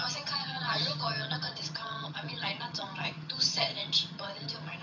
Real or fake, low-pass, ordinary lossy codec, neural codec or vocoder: fake; 7.2 kHz; none; vocoder, 22.05 kHz, 80 mel bands, HiFi-GAN